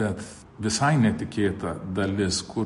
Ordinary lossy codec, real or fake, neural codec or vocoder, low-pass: MP3, 48 kbps; real; none; 14.4 kHz